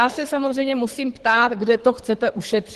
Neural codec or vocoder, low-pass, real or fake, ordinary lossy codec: codec, 24 kHz, 3 kbps, HILCodec; 10.8 kHz; fake; Opus, 16 kbps